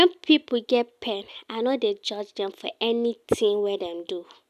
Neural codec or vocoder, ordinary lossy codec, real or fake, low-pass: none; none; real; 14.4 kHz